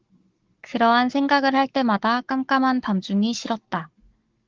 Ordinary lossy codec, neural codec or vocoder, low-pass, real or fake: Opus, 16 kbps; codec, 44.1 kHz, 7.8 kbps, Pupu-Codec; 7.2 kHz; fake